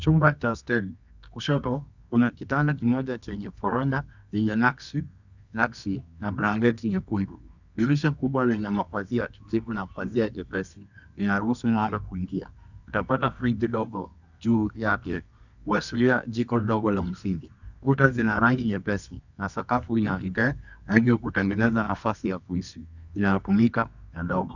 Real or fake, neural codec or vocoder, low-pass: fake; codec, 24 kHz, 0.9 kbps, WavTokenizer, medium music audio release; 7.2 kHz